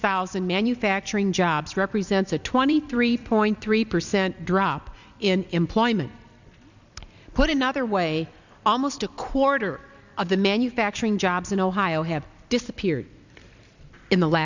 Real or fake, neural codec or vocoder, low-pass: fake; vocoder, 44.1 kHz, 128 mel bands every 256 samples, BigVGAN v2; 7.2 kHz